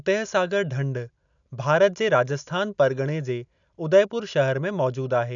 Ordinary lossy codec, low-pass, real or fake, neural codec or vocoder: none; 7.2 kHz; real; none